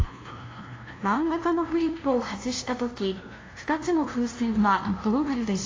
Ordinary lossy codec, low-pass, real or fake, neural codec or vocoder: AAC, 32 kbps; 7.2 kHz; fake; codec, 16 kHz, 0.5 kbps, FunCodec, trained on LibriTTS, 25 frames a second